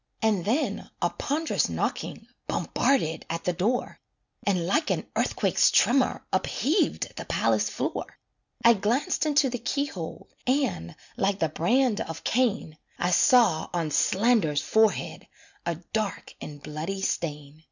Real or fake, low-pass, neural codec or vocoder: real; 7.2 kHz; none